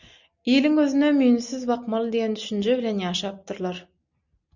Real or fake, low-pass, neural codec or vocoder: real; 7.2 kHz; none